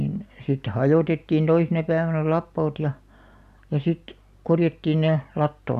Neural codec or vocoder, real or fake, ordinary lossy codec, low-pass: none; real; none; 14.4 kHz